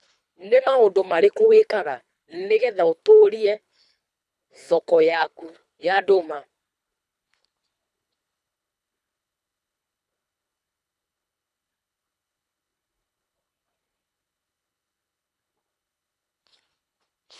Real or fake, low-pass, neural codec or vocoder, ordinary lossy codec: fake; none; codec, 24 kHz, 3 kbps, HILCodec; none